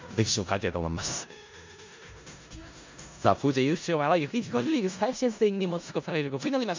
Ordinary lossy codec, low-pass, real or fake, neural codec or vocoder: MP3, 64 kbps; 7.2 kHz; fake; codec, 16 kHz in and 24 kHz out, 0.4 kbps, LongCat-Audio-Codec, four codebook decoder